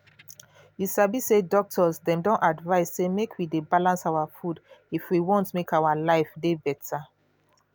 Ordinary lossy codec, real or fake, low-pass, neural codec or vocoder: none; real; none; none